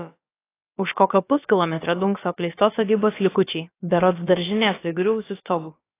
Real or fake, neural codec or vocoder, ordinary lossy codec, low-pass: fake; codec, 16 kHz, about 1 kbps, DyCAST, with the encoder's durations; AAC, 24 kbps; 3.6 kHz